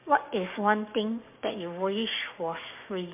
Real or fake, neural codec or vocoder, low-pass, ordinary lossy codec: fake; codec, 16 kHz, 6 kbps, DAC; 3.6 kHz; MP3, 32 kbps